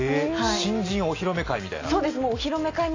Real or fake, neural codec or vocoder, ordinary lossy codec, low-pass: real; none; AAC, 32 kbps; 7.2 kHz